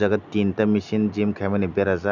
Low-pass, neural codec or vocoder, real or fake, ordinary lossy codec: 7.2 kHz; none; real; none